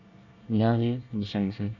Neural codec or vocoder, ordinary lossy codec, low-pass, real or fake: codec, 24 kHz, 1 kbps, SNAC; none; 7.2 kHz; fake